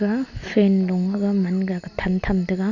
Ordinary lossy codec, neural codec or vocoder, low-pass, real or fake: none; none; 7.2 kHz; real